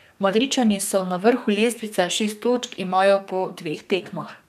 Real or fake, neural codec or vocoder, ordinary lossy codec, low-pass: fake; codec, 32 kHz, 1.9 kbps, SNAC; none; 14.4 kHz